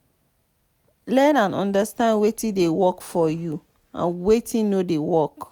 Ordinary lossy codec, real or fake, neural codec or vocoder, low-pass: none; real; none; none